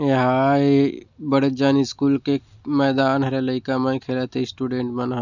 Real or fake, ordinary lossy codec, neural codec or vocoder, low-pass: real; none; none; 7.2 kHz